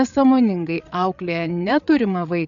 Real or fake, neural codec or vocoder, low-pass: fake; codec, 16 kHz, 8 kbps, FreqCodec, larger model; 7.2 kHz